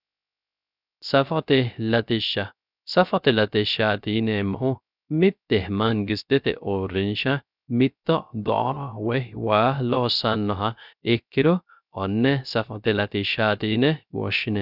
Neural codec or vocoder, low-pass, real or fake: codec, 16 kHz, 0.3 kbps, FocalCodec; 5.4 kHz; fake